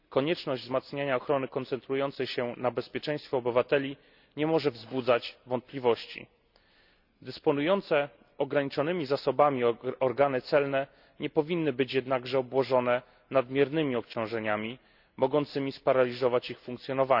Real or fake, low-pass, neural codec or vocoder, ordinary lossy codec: real; 5.4 kHz; none; none